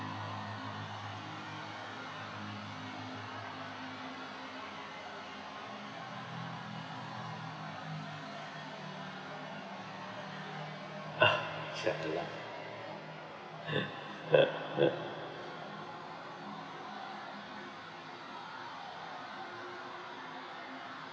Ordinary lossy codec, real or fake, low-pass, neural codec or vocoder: none; real; none; none